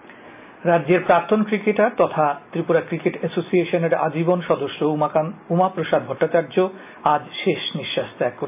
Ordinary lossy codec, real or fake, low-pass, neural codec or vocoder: AAC, 32 kbps; real; 3.6 kHz; none